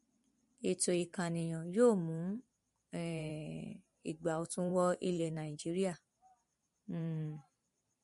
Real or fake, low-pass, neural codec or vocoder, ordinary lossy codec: fake; 14.4 kHz; vocoder, 44.1 kHz, 128 mel bands every 512 samples, BigVGAN v2; MP3, 48 kbps